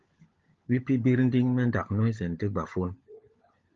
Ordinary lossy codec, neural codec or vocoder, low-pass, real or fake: Opus, 32 kbps; codec, 16 kHz, 16 kbps, FunCodec, trained on LibriTTS, 50 frames a second; 7.2 kHz; fake